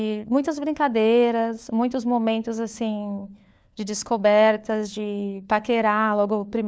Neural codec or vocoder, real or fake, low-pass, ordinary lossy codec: codec, 16 kHz, 4 kbps, FunCodec, trained on LibriTTS, 50 frames a second; fake; none; none